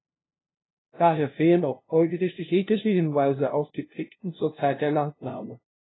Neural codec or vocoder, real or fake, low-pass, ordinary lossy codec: codec, 16 kHz, 0.5 kbps, FunCodec, trained on LibriTTS, 25 frames a second; fake; 7.2 kHz; AAC, 16 kbps